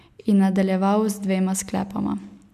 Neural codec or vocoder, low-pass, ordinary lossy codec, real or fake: none; 14.4 kHz; none; real